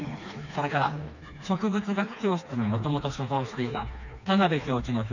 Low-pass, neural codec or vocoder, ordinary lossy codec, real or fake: 7.2 kHz; codec, 16 kHz, 2 kbps, FreqCodec, smaller model; none; fake